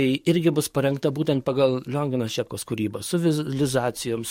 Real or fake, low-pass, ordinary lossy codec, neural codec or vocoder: fake; 19.8 kHz; MP3, 64 kbps; codec, 44.1 kHz, 7.8 kbps, DAC